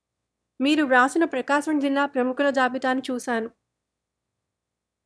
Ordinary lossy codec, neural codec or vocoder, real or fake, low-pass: none; autoencoder, 22.05 kHz, a latent of 192 numbers a frame, VITS, trained on one speaker; fake; none